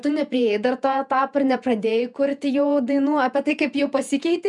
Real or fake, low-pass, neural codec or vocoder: fake; 10.8 kHz; vocoder, 48 kHz, 128 mel bands, Vocos